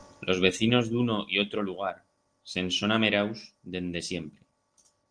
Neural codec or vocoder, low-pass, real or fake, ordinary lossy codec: none; 9.9 kHz; real; Opus, 24 kbps